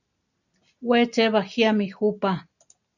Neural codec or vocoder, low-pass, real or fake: none; 7.2 kHz; real